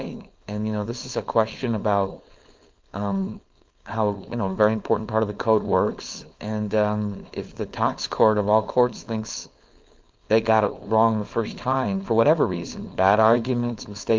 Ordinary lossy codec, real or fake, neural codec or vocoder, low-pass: Opus, 24 kbps; fake; codec, 16 kHz, 4.8 kbps, FACodec; 7.2 kHz